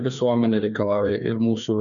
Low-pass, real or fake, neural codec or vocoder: 7.2 kHz; fake; codec, 16 kHz, 2 kbps, FreqCodec, larger model